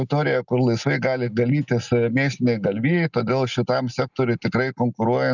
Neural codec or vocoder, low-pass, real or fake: none; 7.2 kHz; real